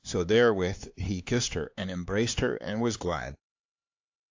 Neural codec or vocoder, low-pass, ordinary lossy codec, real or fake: codec, 16 kHz, 4 kbps, X-Codec, HuBERT features, trained on LibriSpeech; 7.2 kHz; AAC, 48 kbps; fake